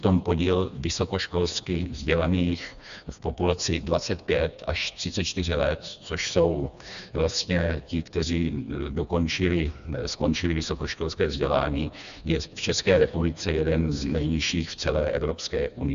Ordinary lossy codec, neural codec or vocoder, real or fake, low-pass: AAC, 96 kbps; codec, 16 kHz, 2 kbps, FreqCodec, smaller model; fake; 7.2 kHz